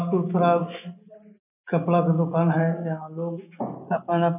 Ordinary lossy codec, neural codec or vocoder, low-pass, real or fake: none; none; 3.6 kHz; real